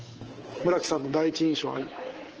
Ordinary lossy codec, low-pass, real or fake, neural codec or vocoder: Opus, 16 kbps; 7.2 kHz; fake; codec, 24 kHz, 3.1 kbps, DualCodec